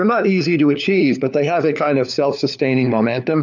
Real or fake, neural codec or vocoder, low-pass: fake; codec, 16 kHz, 4 kbps, FunCodec, trained on LibriTTS, 50 frames a second; 7.2 kHz